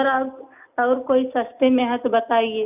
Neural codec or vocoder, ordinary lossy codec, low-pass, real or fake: none; none; 3.6 kHz; real